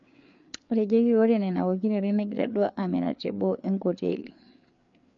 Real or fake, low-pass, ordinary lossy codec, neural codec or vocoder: fake; 7.2 kHz; MP3, 48 kbps; codec, 16 kHz, 4 kbps, FreqCodec, larger model